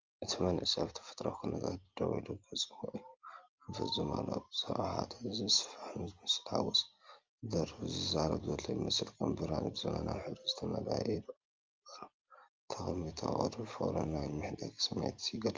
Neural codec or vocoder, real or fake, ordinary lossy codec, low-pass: none; real; Opus, 32 kbps; 7.2 kHz